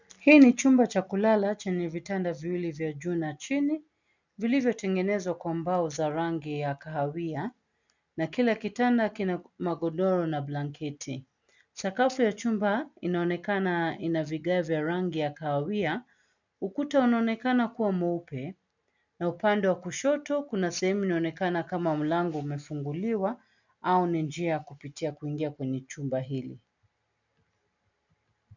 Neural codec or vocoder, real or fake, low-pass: none; real; 7.2 kHz